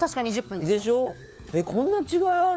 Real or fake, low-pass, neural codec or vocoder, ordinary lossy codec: fake; none; codec, 16 kHz, 4 kbps, FunCodec, trained on LibriTTS, 50 frames a second; none